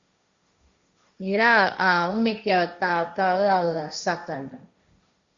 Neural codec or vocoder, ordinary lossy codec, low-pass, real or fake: codec, 16 kHz, 1.1 kbps, Voila-Tokenizer; Opus, 64 kbps; 7.2 kHz; fake